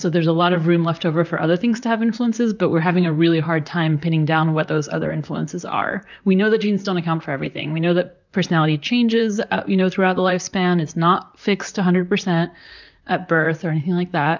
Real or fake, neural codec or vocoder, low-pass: fake; vocoder, 44.1 kHz, 128 mel bands, Pupu-Vocoder; 7.2 kHz